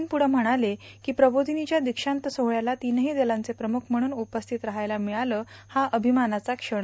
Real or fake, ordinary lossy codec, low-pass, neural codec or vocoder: real; none; none; none